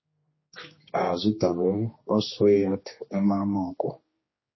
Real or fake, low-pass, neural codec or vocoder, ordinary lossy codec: fake; 7.2 kHz; codec, 16 kHz, 2 kbps, X-Codec, HuBERT features, trained on general audio; MP3, 24 kbps